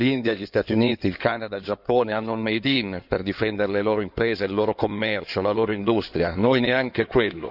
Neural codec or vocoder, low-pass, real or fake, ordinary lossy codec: codec, 16 kHz in and 24 kHz out, 2.2 kbps, FireRedTTS-2 codec; 5.4 kHz; fake; none